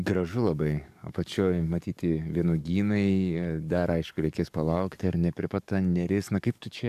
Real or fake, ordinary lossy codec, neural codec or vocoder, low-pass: fake; AAC, 96 kbps; codec, 44.1 kHz, 7.8 kbps, DAC; 14.4 kHz